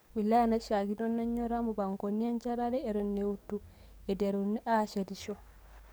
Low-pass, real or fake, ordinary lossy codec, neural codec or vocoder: none; fake; none; codec, 44.1 kHz, 7.8 kbps, DAC